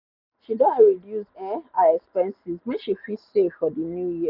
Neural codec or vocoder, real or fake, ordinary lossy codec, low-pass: none; real; none; 5.4 kHz